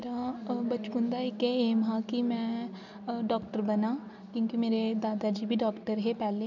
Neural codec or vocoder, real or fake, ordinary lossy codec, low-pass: none; real; none; 7.2 kHz